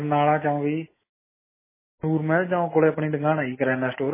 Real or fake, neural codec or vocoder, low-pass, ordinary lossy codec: real; none; 3.6 kHz; MP3, 16 kbps